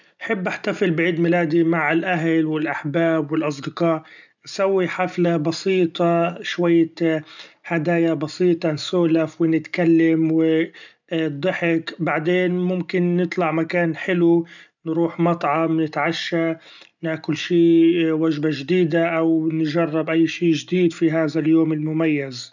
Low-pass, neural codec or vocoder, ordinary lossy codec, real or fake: 7.2 kHz; none; none; real